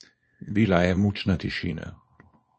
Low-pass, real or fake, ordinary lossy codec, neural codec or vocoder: 9.9 kHz; fake; MP3, 32 kbps; codec, 24 kHz, 0.9 kbps, WavTokenizer, small release